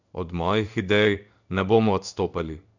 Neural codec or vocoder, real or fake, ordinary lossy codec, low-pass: codec, 16 kHz in and 24 kHz out, 1 kbps, XY-Tokenizer; fake; none; 7.2 kHz